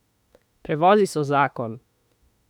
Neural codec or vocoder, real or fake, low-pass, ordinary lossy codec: autoencoder, 48 kHz, 32 numbers a frame, DAC-VAE, trained on Japanese speech; fake; 19.8 kHz; none